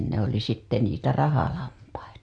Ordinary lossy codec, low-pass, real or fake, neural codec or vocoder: MP3, 64 kbps; 9.9 kHz; real; none